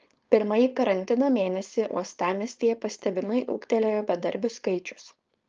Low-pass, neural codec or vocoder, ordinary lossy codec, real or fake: 7.2 kHz; codec, 16 kHz, 4.8 kbps, FACodec; Opus, 24 kbps; fake